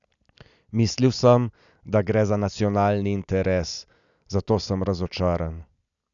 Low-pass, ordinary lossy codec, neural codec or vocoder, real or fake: 7.2 kHz; none; none; real